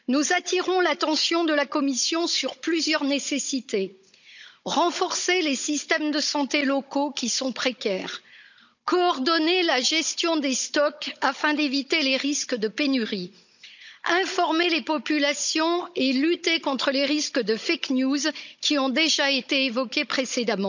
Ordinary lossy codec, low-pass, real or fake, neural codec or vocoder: none; 7.2 kHz; fake; codec, 16 kHz, 16 kbps, FunCodec, trained on Chinese and English, 50 frames a second